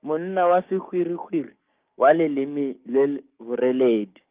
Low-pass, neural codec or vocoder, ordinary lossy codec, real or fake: 3.6 kHz; codec, 16 kHz, 6 kbps, DAC; Opus, 32 kbps; fake